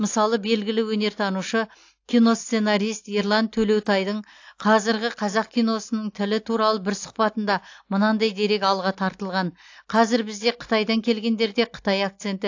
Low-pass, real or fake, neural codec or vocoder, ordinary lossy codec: 7.2 kHz; real; none; AAC, 48 kbps